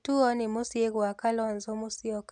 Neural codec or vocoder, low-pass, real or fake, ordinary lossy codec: none; 9.9 kHz; real; none